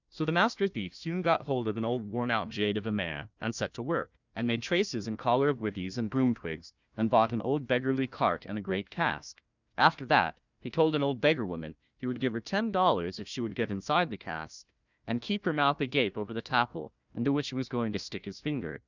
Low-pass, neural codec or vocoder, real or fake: 7.2 kHz; codec, 16 kHz, 1 kbps, FunCodec, trained on Chinese and English, 50 frames a second; fake